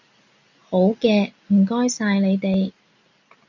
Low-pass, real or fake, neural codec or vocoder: 7.2 kHz; real; none